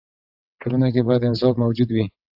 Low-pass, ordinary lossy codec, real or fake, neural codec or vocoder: 5.4 kHz; AAC, 48 kbps; fake; vocoder, 22.05 kHz, 80 mel bands, WaveNeXt